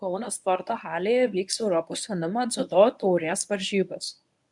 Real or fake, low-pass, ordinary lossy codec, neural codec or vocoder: fake; 10.8 kHz; MP3, 96 kbps; codec, 24 kHz, 0.9 kbps, WavTokenizer, medium speech release version 1